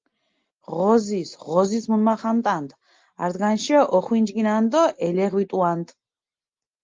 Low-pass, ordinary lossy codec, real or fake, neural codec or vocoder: 7.2 kHz; Opus, 32 kbps; real; none